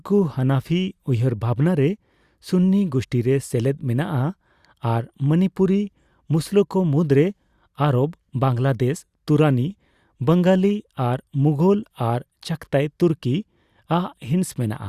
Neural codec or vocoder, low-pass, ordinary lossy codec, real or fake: none; 14.4 kHz; Opus, 64 kbps; real